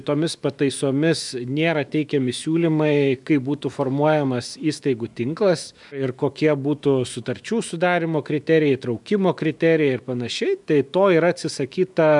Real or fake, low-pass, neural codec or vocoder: fake; 10.8 kHz; autoencoder, 48 kHz, 128 numbers a frame, DAC-VAE, trained on Japanese speech